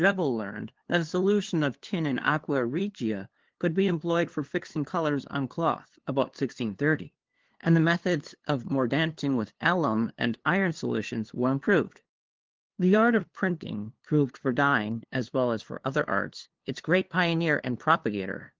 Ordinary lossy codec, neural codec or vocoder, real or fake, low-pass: Opus, 16 kbps; codec, 16 kHz, 2 kbps, FunCodec, trained on LibriTTS, 25 frames a second; fake; 7.2 kHz